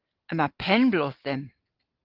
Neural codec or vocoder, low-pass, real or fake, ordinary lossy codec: none; 5.4 kHz; real; Opus, 16 kbps